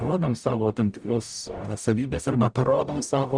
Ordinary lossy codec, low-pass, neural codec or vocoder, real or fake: Opus, 64 kbps; 9.9 kHz; codec, 44.1 kHz, 0.9 kbps, DAC; fake